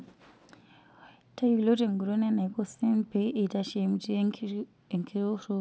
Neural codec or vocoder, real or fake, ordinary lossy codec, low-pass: none; real; none; none